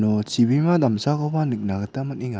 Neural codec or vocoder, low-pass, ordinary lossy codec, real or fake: none; none; none; real